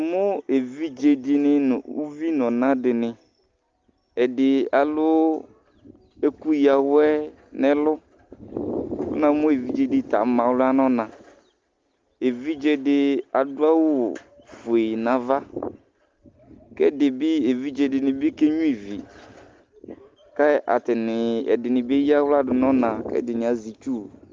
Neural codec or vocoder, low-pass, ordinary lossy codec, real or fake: none; 7.2 kHz; Opus, 32 kbps; real